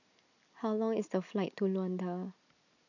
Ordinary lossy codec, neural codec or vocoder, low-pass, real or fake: none; none; 7.2 kHz; real